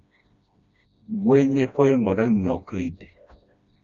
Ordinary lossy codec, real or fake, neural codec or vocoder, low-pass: Opus, 64 kbps; fake; codec, 16 kHz, 1 kbps, FreqCodec, smaller model; 7.2 kHz